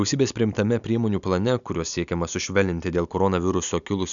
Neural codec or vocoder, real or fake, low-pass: none; real; 7.2 kHz